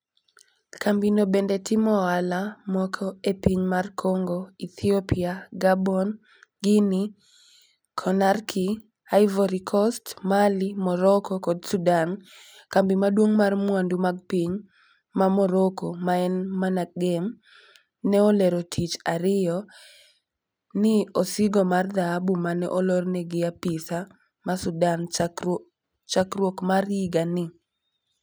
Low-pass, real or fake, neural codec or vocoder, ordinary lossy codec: none; real; none; none